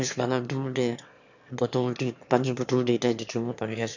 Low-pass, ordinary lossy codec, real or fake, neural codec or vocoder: 7.2 kHz; none; fake; autoencoder, 22.05 kHz, a latent of 192 numbers a frame, VITS, trained on one speaker